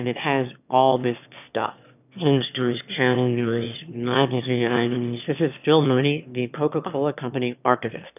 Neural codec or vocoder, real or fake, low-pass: autoencoder, 22.05 kHz, a latent of 192 numbers a frame, VITS, trained on one speaker; fake; 3.6 kHz